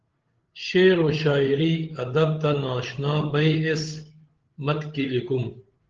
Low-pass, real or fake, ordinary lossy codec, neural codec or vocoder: 7.2 kHz; fake; Opus, 16 kbps; codec, 16 kHz, 8 kbps, FreqCodec, larger model